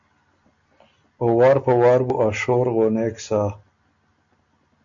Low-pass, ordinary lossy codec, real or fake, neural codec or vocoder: 7.2 kHz; MP3, 64 kbps; real; none